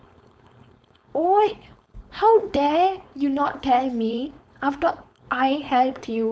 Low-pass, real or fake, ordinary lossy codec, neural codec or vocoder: none; fake; none; codec, 16 kHz, 4.8 kbps, FACodec